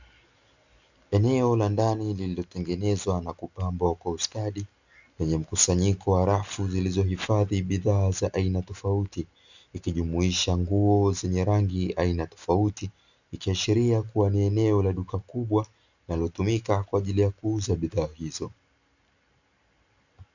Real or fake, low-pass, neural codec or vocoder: real; 7.2 kHz; none